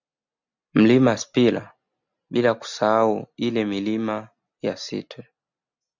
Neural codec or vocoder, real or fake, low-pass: none; real; 7.2 kHz